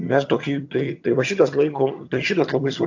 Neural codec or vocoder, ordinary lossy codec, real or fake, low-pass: vocoder, 22.05 kHz, 80 mel bands, HiFi-GAN; AAC, 48 kbps; fake; 7.2 kHz